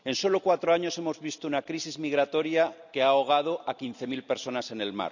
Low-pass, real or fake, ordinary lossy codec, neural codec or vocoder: 7.2 kHz; real; none; none